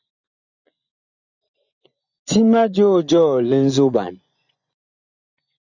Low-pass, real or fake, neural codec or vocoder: 7.2 kHz; fake; vocoder, 24 kHz, 100 mel bands, Vocos